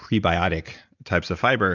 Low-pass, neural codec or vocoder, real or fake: 7.2 kHz; none; real